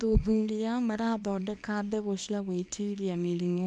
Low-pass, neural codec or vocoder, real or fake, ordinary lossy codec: none; codec, 24 kHz, 0.9 kbps, WavTokenizer, small release; fake; none